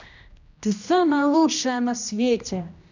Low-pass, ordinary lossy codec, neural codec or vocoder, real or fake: 7.2 kHz; none; codec, 16 kHz, 1 kbps, X-Codec, HuBERT features, trained on general audio; fake